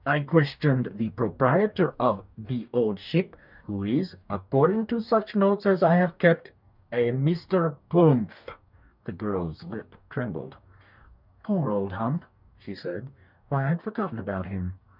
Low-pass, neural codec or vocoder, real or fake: 5.4 kHz; codec, 44.1 kHz, 2.6 kbps, DAC; fake